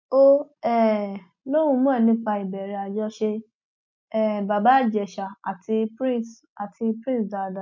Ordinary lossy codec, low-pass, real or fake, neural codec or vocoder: MP3, 48 kbps; 7.2 kHz; real; none